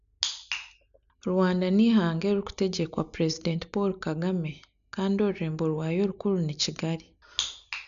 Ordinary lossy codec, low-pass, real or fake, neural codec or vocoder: none; 7.2 kHz; real; none